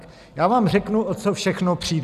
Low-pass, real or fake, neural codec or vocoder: 14.4 kHz; real; none